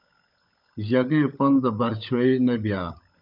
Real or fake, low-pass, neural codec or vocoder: fake; 5.4 kHz; codec, 16 kHz, 16 kbps, FunCodec, trained on LibriTTS, 50 frames a second